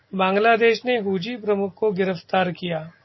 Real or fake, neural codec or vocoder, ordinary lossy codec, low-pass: real; none; MP3, 24 kbps; 7.2 kHz